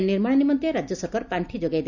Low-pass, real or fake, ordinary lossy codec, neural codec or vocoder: 7.2 kHz; real; none; none